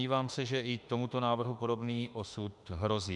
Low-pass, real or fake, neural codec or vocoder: 10.8 kHz; fake; autoencoder, 48 kHz, 32 numbers a frame, DAC-VAE, trained on Japanese speech